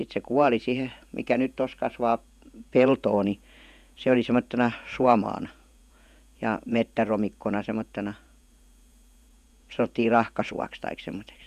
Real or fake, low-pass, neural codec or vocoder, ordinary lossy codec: fake; 14.4 kHz; vocoder, 48 kHz, 128 mel bands, Vocos; none